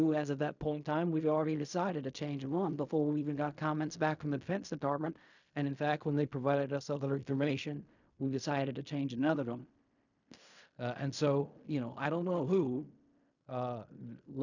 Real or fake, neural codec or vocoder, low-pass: fake; codec, 16 kHz in and 24 kHz out, 0.4 kbps, LongCat-Audio-Codec, fine tuned four codebook decoder; 7.2 kHz